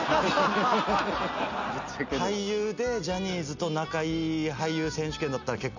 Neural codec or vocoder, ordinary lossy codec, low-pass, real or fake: none; none; 7.2 kHz; real